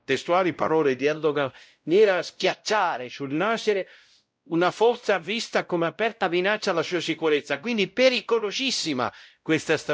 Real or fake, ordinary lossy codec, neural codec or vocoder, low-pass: fake; none; codec, 16 kHz, 0.5 kbps, X-Codec, WavLM features, trained on Multilingual LibriSpeech; none